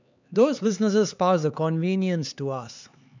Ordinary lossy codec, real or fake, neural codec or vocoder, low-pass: none; fake; codec, 16 kHz, 4 kbps, X-Codec, HuBERT features, trained on LibriSpeech; 7.2 kHz